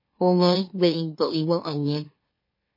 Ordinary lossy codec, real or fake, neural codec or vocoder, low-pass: MP3, 24 kbps; fake; autoencoder, 44.1 kHz, a latent of 192 numbers a frame, MeloTTS; 5.4 kHz